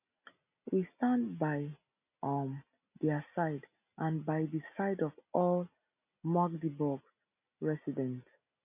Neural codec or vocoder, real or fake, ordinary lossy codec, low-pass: none; real; none; 3.6 kHz